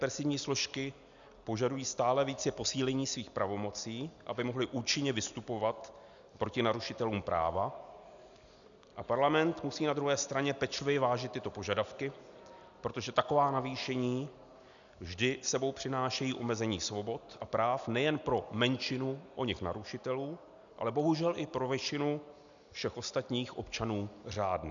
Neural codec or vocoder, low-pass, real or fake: none; 7.2 kHz; real